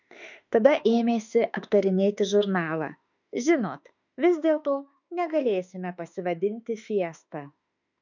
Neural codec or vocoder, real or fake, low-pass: autoencoder, 48 kHz, 32 numbers a frame, DAC-VAE, trained on Japanese speech; fake; 7.2 kHz